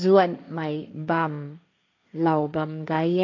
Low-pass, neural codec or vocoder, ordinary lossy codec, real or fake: 7.2 kHz; codec, 16 kHz, 1.1 kbps, Voila-Tokenizer; AAC, 48 kbps; fake